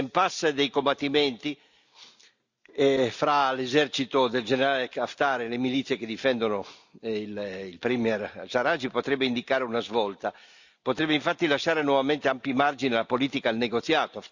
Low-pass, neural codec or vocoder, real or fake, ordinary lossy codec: 7.2 kHz; none; real; Opus, 64 kbps